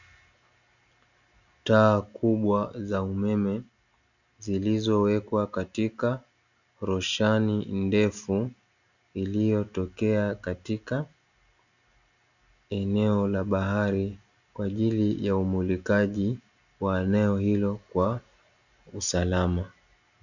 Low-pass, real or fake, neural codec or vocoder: 7.2 kHz; real; none